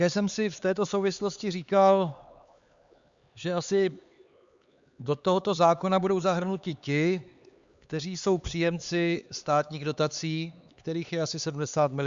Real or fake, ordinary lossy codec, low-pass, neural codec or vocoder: fake; Opus, 64 kbps; 7.2 kHz; codec, 16 kHz, 4 kbps, X-Codec, HuBERT features, trained on LibriSpeech